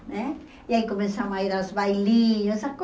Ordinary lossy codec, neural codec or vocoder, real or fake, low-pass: none; none; real; none